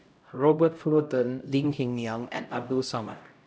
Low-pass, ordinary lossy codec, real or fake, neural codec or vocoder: none; none; fake; codec, 16 kHz, 0.5 kbps, X-Codec, HuBERT features, trained on LibriSpeech